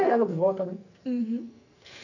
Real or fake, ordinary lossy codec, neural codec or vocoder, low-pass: fake; none; codec, 44.1 kHz, 2.6 kbps, SNAC; 7.2 kHz